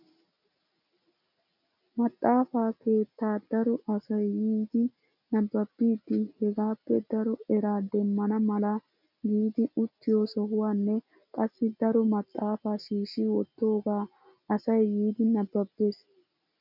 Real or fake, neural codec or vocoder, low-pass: real; none; 5.4 kHz